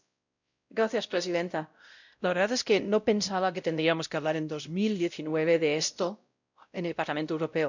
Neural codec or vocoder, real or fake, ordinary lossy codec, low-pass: codec, 16 kHz, 0.5 kbps, X-Codec, WavLM features, trained on Multilingual LibriSpeech; fake; none; 7.2 kHz